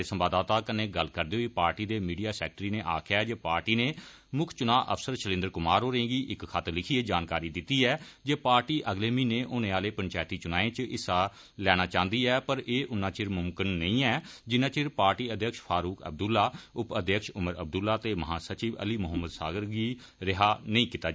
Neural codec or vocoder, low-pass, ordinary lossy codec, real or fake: none; none; none; real